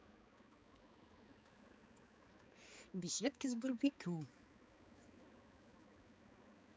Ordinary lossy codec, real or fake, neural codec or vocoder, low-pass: none; fake; codec, 16 kHz, 4 kbps, X-Codec, HuBERT features, trained on balanced general audio; none